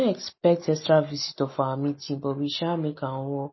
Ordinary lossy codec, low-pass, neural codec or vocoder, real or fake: MP3, 24 kbps; 7.2 kHz; none; real